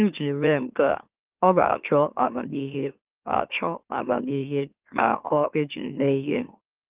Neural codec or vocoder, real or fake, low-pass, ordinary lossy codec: autoencoder, 44.1 kHz, a latent of 192 numbers a frame, MeloTTS; fake; 3.6 kHz; Opus, 32 kbps